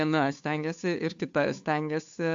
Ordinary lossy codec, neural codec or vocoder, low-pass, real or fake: AAC, 64 kbps; codec, 16 kHz, 2 kbps, FunCodec, trained on Chinese and English, 25 frames a second; 7.2 kHz; fake